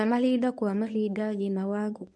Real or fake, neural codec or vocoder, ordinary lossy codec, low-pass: fake; codec, 24 kHz, 0.9 kbps, WavTokenizer, medium speech release version 1; none; none